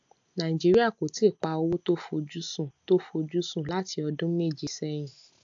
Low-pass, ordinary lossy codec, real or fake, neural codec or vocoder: 7.2 kHz; AAC, 64 kbps; real; none